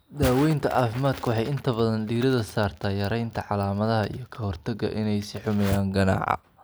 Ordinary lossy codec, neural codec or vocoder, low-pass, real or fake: none; none; none; real